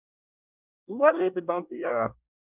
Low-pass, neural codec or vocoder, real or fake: 3.6 kHz; codec, 24 kHz, 1 kbps, SNAC; fake